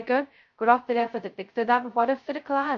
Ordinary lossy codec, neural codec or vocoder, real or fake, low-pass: MP3, 48 kbps; codec, 16 kHz, 0.2 kbps, FocalCodec; fake; 7.2 kHz